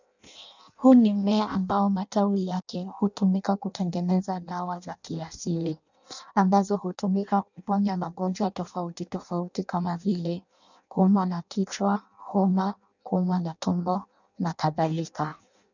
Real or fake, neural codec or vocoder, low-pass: fake; codec, 16 kHz in and 24 kHz out, 0.6 kbps, FireRedTTS-2 codec; 7.2 kHz